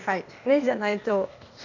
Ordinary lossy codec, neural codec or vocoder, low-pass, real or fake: none; codec, 16 kHz, 0.8 kbps, ZipCodec; 7.2 kHz; fake